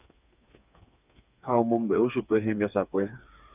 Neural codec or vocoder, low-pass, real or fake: codec, 16 kHz, 4 kbps, FreqCodec, smaller model; 3.6 kHz; fake